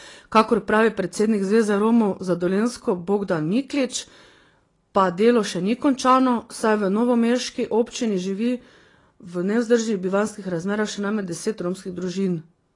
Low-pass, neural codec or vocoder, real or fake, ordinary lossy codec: 10.8 kHz; none; real; AAC, 32 kbps